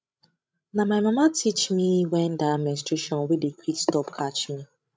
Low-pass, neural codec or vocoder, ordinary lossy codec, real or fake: none; codec, 16 kHz, 16 kbps, FreqCodec, larger model; none; fake